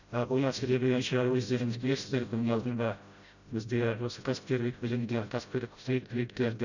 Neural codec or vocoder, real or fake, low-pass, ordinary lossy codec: codec, 16 kHz, 0.5 kbps, FreqCodec, smaller model; fake; 7.2 kHz; none